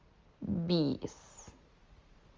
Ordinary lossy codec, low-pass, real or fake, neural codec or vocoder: Opus, 32 kbps; 7.2 kHz; real; none